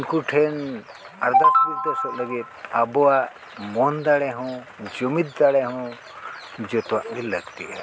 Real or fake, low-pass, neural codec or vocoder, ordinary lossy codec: real; none; none; none